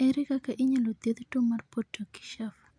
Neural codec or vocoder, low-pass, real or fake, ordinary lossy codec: none; 9.9 kHz; real; none